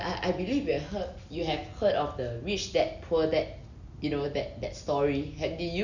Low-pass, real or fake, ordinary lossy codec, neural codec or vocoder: 7.2 kHz; real; none; none